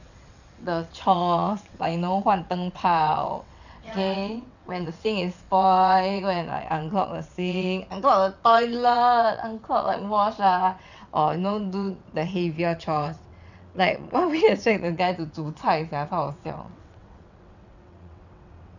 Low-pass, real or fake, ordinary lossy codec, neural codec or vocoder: 7.2 kHz; fake; none; vocoder, 22.05 kHz, 80 mel bands, WaveNeXt